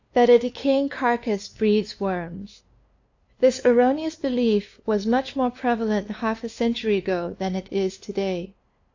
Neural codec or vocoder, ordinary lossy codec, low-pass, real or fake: codec, 16 kHz, 2 kbps, FunCodec, trained on LibriTTS, 25 frames a second; AAC, 48 kbps; 7.2 kHz; fake